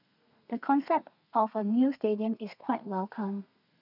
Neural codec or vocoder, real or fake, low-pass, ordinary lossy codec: codec, 32 kHz, 1.9 kbps, SNAC; fake; 5.4 kHz; none